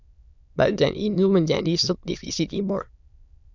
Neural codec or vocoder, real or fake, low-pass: autoencoder, 22.05 kHz, a latent of 192 numbers a frame, VITS, trained on many speakers; fake; 7.2 kHz